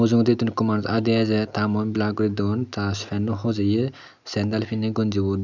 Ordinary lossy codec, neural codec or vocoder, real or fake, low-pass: none; none; real; 7.2 kHz